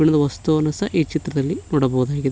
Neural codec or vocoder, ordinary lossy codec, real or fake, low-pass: none; none; real; none